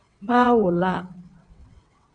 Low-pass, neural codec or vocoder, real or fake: 9.9 kHz; vocoder, 22.05 kHz, 80 mel bands, WaveNeXt; fake